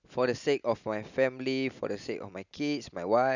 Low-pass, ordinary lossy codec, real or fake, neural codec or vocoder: 7.2 kHz; none; real; none